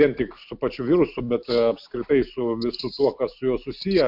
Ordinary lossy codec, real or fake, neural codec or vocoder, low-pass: MP3, 48 kbps; real; none; 5.4 kHz